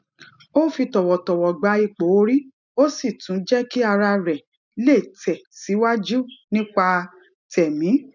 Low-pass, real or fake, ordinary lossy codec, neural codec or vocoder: 7.2 kHz; real; none; none